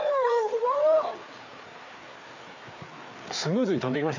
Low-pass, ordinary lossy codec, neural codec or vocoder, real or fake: 7.2 kHz; MP3, 48 kbps; codec, 16 kHz, 4 kbps, FreqCodec, larger model; fake